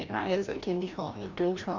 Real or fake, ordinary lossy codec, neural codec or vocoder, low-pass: fake; none; codec, 16 kHz, 1 kbps, FreqCodec, larger model; 7.2 kHz